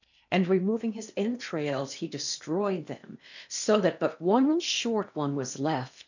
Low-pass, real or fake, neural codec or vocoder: 7.2 kHz; fake; codec, 16 kHz in and 24 kHz out, 0.8 kbps, FocalCodec, streaming, 65536 codes